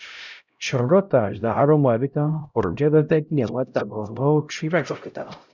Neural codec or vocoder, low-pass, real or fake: codec, 16 kHz, 0.5 kbps, X-Codec, HuBERT features, trained on LibriSpeech; 7.2 kHz; fake